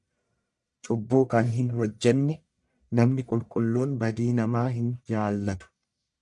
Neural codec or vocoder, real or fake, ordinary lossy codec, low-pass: codec, 44.1 kHz, 1.7 kbps, Pupu-Codec; fake; MP3, 96 kbps; 10.8 kHz